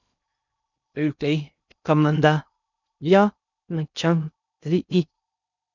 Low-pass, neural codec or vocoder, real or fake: 7.2 kHz; codec, 16 kHz in and 24 kHz out, 0.6 kbps, FocalCodec, streaming, 2048 codes; fake